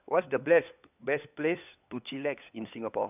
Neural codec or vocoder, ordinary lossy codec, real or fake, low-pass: codec, 16 kHz, 4 kbps, FunCodec, trained on LibriTTS, 50 frames a second; none; fake; 3.6 kHz